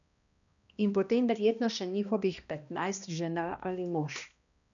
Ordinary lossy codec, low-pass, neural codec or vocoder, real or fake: none; 7.2 kHz; codec, 16 kHz, 1 kbps, X-Codec, HuBERT features, trained on balanced general audio; fake